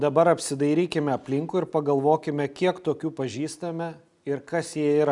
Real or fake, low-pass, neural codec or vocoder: real; 10.8 kHz; none